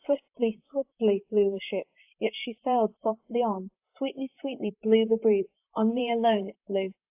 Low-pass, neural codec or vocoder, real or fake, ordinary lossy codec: 3.6 kHz; vocoder, 44.1 kHz, 128 mel bands, Pupu-Vocoder; fake; AAC, 32 kbps